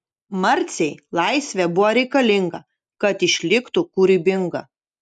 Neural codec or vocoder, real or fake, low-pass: none; real; 10.8 kHz